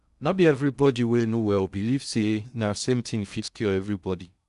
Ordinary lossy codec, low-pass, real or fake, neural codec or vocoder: none; 10.8 kHz; fake; codec, 16 kHz in and 24 kHz out, 0.6 kbps, FocalCodec, streaming, 2048 codes